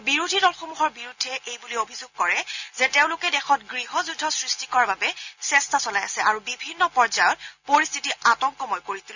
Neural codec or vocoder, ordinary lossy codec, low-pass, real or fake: none; none; 7.2 kHz; real